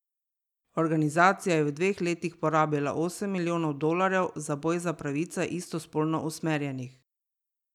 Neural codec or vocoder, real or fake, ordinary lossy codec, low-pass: none; real; none; 19.8 kHz